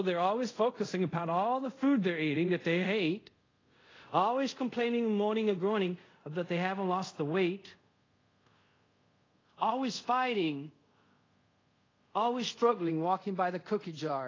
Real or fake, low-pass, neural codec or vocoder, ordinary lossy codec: fake; 7.2 kHz; codec, 24 kHz, 0.5 kbps, DualCodec; AAC, 32 kbps